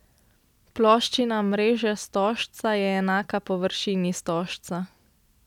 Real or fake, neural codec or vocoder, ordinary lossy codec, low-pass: real; none; none; 19.8 kHz